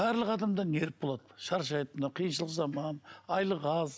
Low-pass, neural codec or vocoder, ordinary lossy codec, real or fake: none; none; none; real